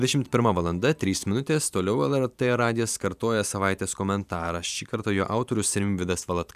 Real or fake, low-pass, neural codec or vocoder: fake; 14.4 kHz; vocoder, 44.1 kHz, 128 mel bands every 512 samples, BigVGAN v2